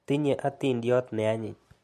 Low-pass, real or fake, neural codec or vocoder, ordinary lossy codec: 14.4 kHz; real; none; MP3, 64 kbps